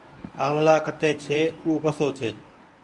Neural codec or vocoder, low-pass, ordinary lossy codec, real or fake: codec, 24 kHz, 0.9 kbps, WavTokenizer, medium speech release version 1; 10.8 kHz; Opus, 64 kbps; fake